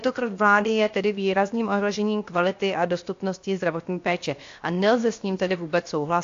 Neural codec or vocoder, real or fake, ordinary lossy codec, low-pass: codec, 16 kHz, 0.7 kbps, FocalCodec; fake; AAC, 48 kbps; 7.2 kHz